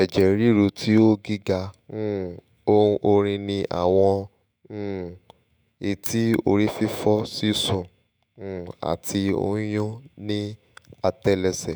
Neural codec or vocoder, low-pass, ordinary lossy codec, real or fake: autoencoder, 48 kHz, 128 numbers a frame, DAC-VAE, trained on Japanese speech; none; none; fake